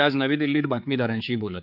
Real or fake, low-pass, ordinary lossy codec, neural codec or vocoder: fake; 5.4 kHz; none; codec, 16 kHz, 4 kbps, X-Codec, HuBERT features, trained on general audio